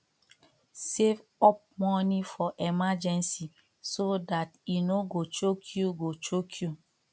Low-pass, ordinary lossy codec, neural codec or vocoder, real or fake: none; none; none; real